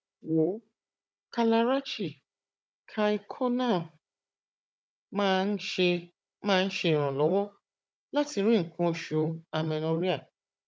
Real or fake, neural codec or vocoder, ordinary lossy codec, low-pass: fake; codec, 16 kHz, 16 kbps, FunCodec, trained on Chinese and English, 50 frames a second; none; none